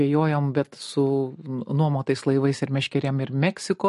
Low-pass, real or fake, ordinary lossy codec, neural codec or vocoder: 14.4 kHz; real; MP3, 48 kbps; none